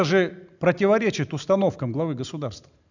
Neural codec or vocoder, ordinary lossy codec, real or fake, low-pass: none; none; real; 7.2 kHz